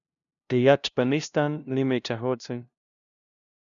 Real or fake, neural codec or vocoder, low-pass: fake; codec, 16 kHz, 0.5 kbps, FunCodec, trained on LibriTTS, 25 frames a second; 7.2 kHz